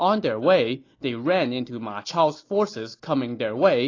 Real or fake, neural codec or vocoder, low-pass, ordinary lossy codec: real; none; 7.2 kHz; AAC, 32 kbps